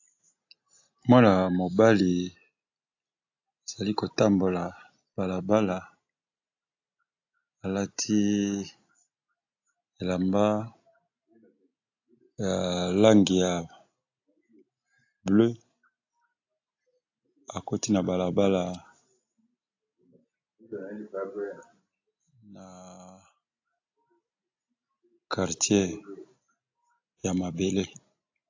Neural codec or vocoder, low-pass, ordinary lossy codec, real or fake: none; 7.2 kHz; AAC, 48 kbps; real